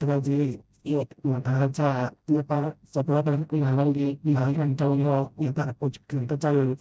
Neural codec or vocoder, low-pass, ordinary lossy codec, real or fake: codec, 16 kHz, 0.5 kbps, FreqCodec, smaller model; none; none; fake